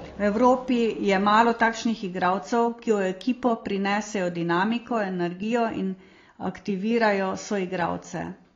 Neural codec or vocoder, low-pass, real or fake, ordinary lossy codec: none; 7.2 kHz; real; AAC, 32 kbps